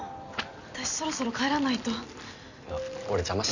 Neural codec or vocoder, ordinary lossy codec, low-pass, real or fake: none; none; 7.2 kHz; real